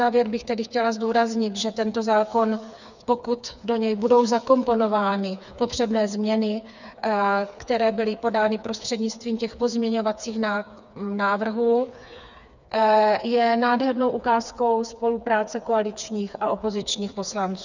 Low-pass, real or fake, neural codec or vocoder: 7.2 kHz; fake; codec, 16 kHz, 4 kbps, FreqCodec, smaller model